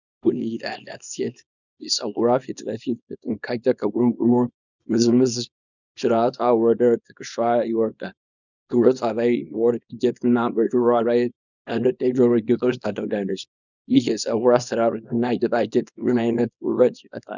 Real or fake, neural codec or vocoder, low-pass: fake; codec, 24 kHz, 0.9 kbps, WavTokenizer, small release; 7.2 kHz